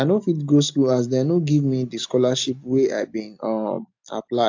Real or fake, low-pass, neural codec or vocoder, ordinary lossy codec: real; 7.2 kHz; none; AAC, 48 kbps